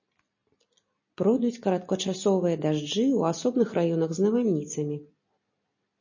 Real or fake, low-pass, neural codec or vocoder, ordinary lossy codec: real; 7.2 kHz; none; MP3, 32 kbps